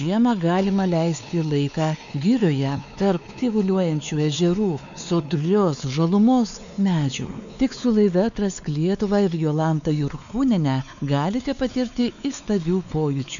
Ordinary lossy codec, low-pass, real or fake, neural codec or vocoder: MP3, 96 kbps; 7.2 kHz; fake; codec, 16 kHz, 4 kbps, X-Codec, WavLM features, trained on Multilingual LibriSpeech